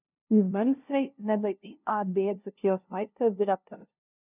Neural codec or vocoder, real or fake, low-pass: codec, 16 kHz, 0.5 kbps, FunCodec, trained on LibriTTS, 25 frames a second; fake; 3.6 kHz